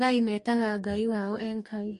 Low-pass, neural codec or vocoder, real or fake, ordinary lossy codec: 14.4 kHz; codec, 44.1 kHz, 2.6 kbps, DAC; fake; MP3, 48 kbps